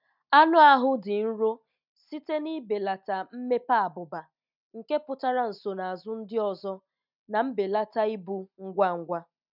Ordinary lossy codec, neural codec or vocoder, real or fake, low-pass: none; none; real; 5.4 kHz